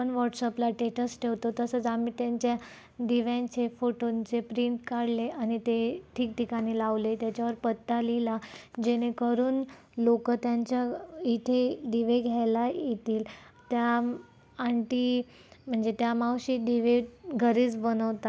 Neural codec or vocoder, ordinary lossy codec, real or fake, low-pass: none; none; real; none